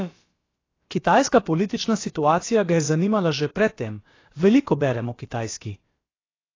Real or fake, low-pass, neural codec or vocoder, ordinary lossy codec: fake; 7.2 kHz; codec, 16 kHz, about 1 kbps, DyCAST, with the encoder's durations; AAC, 32 kbps